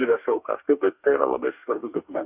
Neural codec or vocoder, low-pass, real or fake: codec, 44.1 kHz, 2.6 kbps, DAC; 3.6 kHz; fake